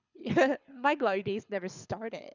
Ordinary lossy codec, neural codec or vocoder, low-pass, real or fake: none; codec, 24 kHz, 6 kbps, HILCodec; 7.2 kHz; fake